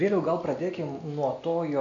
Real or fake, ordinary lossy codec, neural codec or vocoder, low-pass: real; AAC, 48 kbps; none; 7.2 kHz